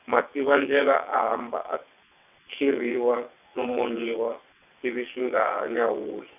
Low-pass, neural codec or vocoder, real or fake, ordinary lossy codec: 3.6 kHz; vocoder, 22.05 kHz, 80 mel bands, WaveNeXt; fake; none